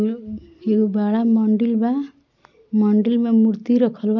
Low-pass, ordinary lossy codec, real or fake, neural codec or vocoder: 7.2 kHz; none; real; none